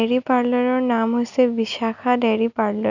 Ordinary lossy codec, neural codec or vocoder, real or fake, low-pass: none; none; real; 7.2 kHz